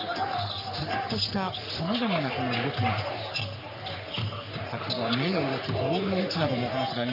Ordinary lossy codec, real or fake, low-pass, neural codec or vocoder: none; fake; 5.4 kHz; codec, 44.1 kHz, 3.4 kbps, Pupu-Codec